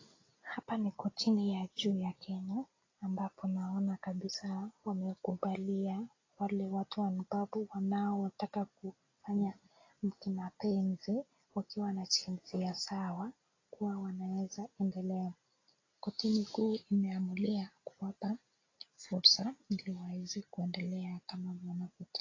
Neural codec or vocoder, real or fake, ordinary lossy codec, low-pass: none; real; AAC, 32 kbps; 7.2 kHz